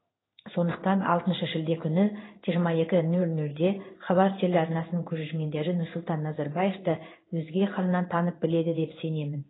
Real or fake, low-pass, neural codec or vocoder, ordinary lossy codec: fake; 7.2 kHz; codec, 16 kHz in and 24 kHz out, 1 kbps, XY-Tokenizer; AAC, 16 kbps